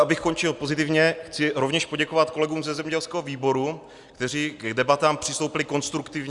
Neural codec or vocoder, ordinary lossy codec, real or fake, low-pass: none; Opus, 64 kbps; real; 10.8 kHz